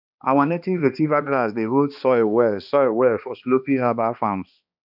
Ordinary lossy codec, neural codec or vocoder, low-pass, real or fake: none; codec, 16 kHz, 2 kbps, X-Codec, HuBERT features, trained on balanced general audio; 5.4 kHz; fake